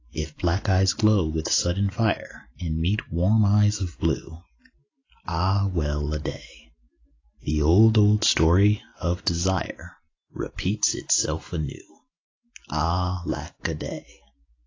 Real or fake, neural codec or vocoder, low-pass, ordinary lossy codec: real; none; 7.2 kHz; AAC, 32 kbps